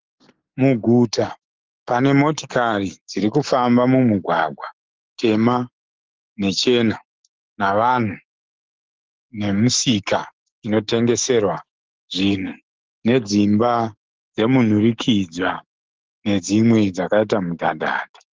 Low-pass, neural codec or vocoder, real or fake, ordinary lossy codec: 7.2 kHz; autoencoder, 48 kHz, 128 numbers a frame, DAC-VAE, trained on Japanese speech; fake; Opus, 16 kbps